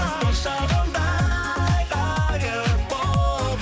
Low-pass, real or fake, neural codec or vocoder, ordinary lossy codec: none; fake; codec, 16 kHz, 4 kbps, X-Codec, HuBERT features, trained on balanced general audio; none